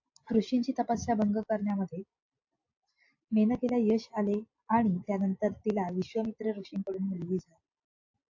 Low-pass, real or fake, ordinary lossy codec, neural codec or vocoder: 7.2 kHz; real; AAC, 48 kbps; none